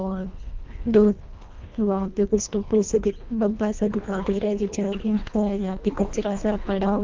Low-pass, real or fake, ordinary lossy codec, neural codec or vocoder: 7.2 kHz; fake; Opus, 24 kbps; codec, 24 kHz, 1.5 kbps, HILCodec